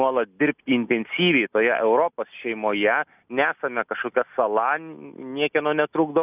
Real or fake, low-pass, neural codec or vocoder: real; 3.6 kHz; none